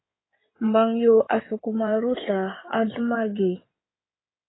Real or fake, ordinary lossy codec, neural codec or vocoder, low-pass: fake; AAC, 16 kbps; codec, 16 kHz in and 24 kHz out, 2.2 kbps, FireRedTTS-2 codec; 7.2 kHz